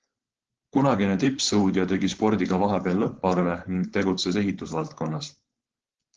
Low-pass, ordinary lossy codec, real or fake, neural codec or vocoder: 7.2 kHz; Opus, 16 kbps; fake; codec, 16 kHz, 4.8 kbps, FACodec